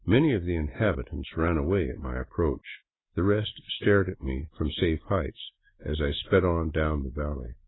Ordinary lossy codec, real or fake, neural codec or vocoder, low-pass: AAC, 16 kbps; real; none; 7.2 kHz